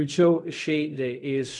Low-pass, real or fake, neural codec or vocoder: 10.8 kHz; fake; codec, 16 kHz in and 24 kHz out, 0.4 kbps, LongCat-Audio-Codec, fine tuned four codebook decoder